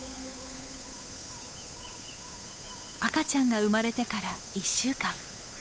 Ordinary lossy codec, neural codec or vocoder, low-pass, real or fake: none; codec, 16 kHz, 8 kbps, FunCodec, trained on Chinese and English, 25 frames a second; none; fake